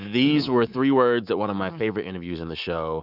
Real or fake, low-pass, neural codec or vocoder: fake; 5.4 kHz; codec, 24 kHz, 3.1 kbps, DualCodec